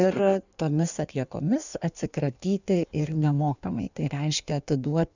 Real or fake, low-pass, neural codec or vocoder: fake; 7.2 kHz; codec, 16 kHz in and 24 kHz out, 1.1 kbps, FireRedTTS-2 codec